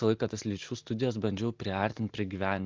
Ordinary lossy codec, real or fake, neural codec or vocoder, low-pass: Opus, 32 kbps; real; none; 7.2 kHz